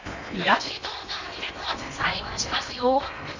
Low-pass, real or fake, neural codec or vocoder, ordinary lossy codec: 7.2 kHz; fake; codec, 16 kHz in and 24 kHz out, 0.8 kbps, FocalCodec, streaming, 65536 codes; none